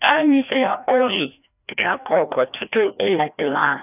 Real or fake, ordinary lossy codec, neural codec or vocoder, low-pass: fake; none; codec, 16 kHz, 1 kbps, FreqCodec, larger model; 3.6 kHz